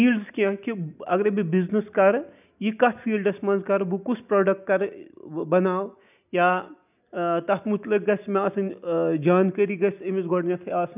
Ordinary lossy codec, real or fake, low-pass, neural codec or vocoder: none; real; 3.6 kHz; none